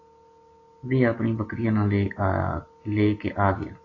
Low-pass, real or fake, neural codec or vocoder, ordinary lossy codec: 7.2 kHz; real; none; AAC, 32 kbps